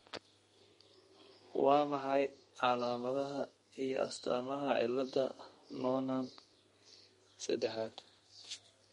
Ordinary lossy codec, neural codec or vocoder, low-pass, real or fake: MP3, 48 kbps; codec, 32 kHz, 1.9 kbps, SNAC; 14.4 kHz; fake